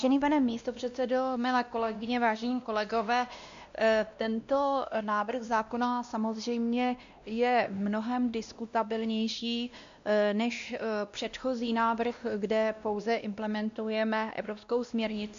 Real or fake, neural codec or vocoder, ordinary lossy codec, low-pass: fake; codec, 16 kHz, 1 kbps, X-Codec, WavLM features, trained on Multilingual LibriSpeech; AAC, 64 kbps; 7.2 kHz